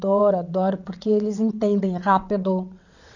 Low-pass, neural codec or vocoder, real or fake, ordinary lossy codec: 7.2 kHz; vocoder, 22.05 kHz, 80 mel bands, WaveNeXt; fake; none